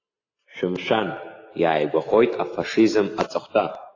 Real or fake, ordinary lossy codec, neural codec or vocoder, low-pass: real; AAC, 32 kbps; none; 7.2 kHz